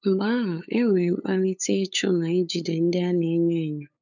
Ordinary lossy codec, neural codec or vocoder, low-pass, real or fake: none; codec, 16 kHz, 8 kbps, FunCodec, trained on LibriTTS, 25 frames a second; 7.2 kHz; fake